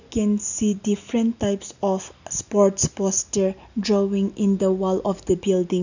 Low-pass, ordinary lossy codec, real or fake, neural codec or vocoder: 7.2 kHz; none; real; none